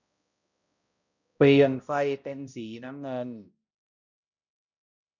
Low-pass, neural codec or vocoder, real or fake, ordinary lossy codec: 7.2 kHz; codec, 16 kHz, 0.5 kbps, X-Codec, HuBERT features, trained on balanced general audio; fake; none